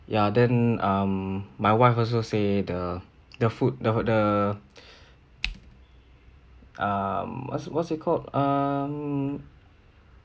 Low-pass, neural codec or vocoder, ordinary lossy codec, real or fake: none; none; none; real